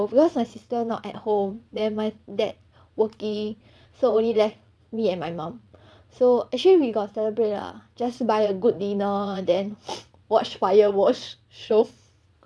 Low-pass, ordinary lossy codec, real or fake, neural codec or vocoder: none; none; fake; vocoder, 22.05 kHz, 80 mel bands, Vocos